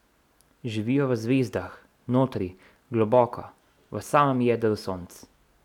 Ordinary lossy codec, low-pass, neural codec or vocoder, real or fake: none; 19.8 kHz; none; real